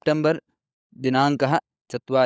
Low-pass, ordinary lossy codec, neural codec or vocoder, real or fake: none; none; codec, 16 kHz, 16 kbps, FunCodec, trained on LibriTTS, 50 frames a second; fake